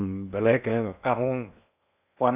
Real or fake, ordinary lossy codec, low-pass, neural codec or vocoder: fake; none; 3.6 kHz; codec, 16 kHz in and 24 kHz out, 0.8 kbps, FocalCodec, streaming, 65536 codes